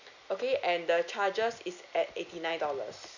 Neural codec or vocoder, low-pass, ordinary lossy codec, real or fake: none; 7.2 kHz; none; real